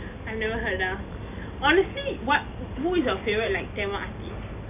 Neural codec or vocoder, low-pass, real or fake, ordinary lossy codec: none; 3.6 kHz; real; none